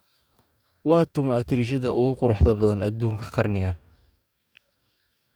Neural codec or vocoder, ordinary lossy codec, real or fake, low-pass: codec, 44.1 kHz, 2.6 kbps, DAC; none; fake; none